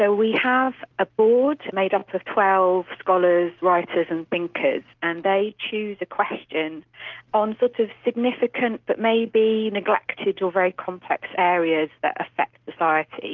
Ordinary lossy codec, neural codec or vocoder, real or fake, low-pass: Opus, 32 kbps; none; real; 7.2 kHz